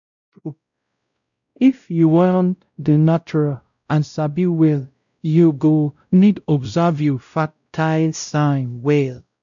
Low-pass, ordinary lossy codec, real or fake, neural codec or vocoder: 7.2 kHz; none; fake; codec, 16 kHz, 0.5 kbps, X-Codec, WavLM features, trained on Multilingual LibriSpeech